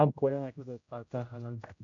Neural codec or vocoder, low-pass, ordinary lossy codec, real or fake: codec, 16 kHz, 0.5 kbps, X-Codec, HuBERT features, trained on general audio; 7.2 kHz; AAC, 64 kbps; fake